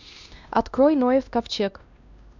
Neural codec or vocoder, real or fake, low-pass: codec, 16 kHz, 1 kbps, X-Codec, WavLM features, trained on Multilingual LibriSpeech; fake; 7.2 kHz